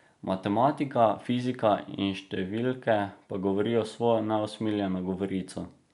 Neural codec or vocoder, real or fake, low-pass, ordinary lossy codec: none; real; 10.8 kHz; none